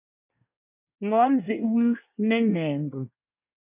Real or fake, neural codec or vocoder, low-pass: fake; codec, 24 kHz, 1 kbps, SNAC; 3.6 kHz